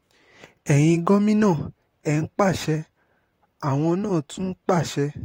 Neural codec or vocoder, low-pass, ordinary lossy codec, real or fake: vocoder, 44.1 kHz, 128 mel bands, Pupu-Vocoder; 19.8 kHz; AAC, 48 kbps; fake